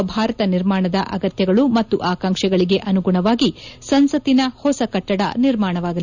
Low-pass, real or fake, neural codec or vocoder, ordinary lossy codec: 7.2 kHz; real; none; none